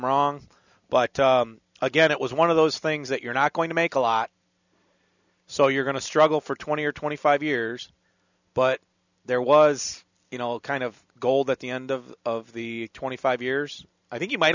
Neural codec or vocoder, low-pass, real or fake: none; 7.2 kHz; real